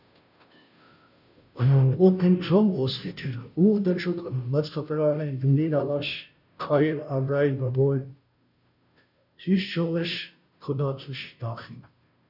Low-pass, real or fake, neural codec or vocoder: 5.4 kHz; fake; codec, 16 kHz, 0.5 kbps, FunCodec, trained on Chinese and English, 25 frames a second